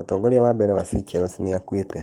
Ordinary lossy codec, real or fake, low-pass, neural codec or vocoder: Opus, 32 kbps; fake; 19.8 kHz; codec, 44.1 kHz, 7.8 kbps, Pupu-Codec